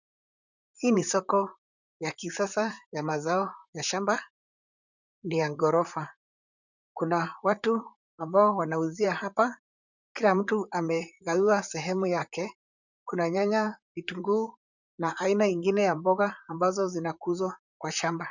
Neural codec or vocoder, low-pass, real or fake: vocoder, 44.1 kHz, 128 mel bands, Pupu-Vocoder; 7.2 kHz; fake